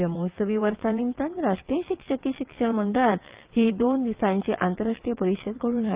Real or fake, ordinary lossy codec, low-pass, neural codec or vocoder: fake; Opus, 32 kbps; 3.6 kHz; vocoder, 22.05 kHz, 80 mel bands, WaveNeXt